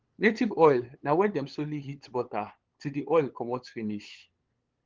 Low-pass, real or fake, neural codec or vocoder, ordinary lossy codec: 7.2 kHz; fake; codec, 16 kHz, 8 kbps, FunCodec, trained on LibriTTS, 25 frames a second; Opus, 16 kbps